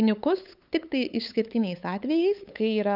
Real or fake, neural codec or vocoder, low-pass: fake; codec, 16 kHz, 8 kbps, FunCodec, trained on LibriTTS, 25 frames a second; 5.4 kHz